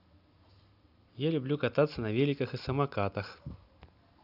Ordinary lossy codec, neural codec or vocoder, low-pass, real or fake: none; none; 5.4 kHz; real